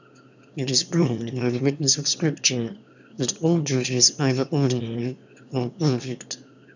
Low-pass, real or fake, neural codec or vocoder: 7.2 kHz; fake; autoencoder, 22.05 kHz, a latent of 192 numbers a frame, VITS, trained on one speaker